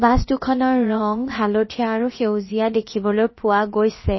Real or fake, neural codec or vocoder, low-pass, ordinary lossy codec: fake; codec, 16 kHz, about 1 kbps, DyCAST, with the encoder's durations; 7.2 kHz; MP3, 24 kbps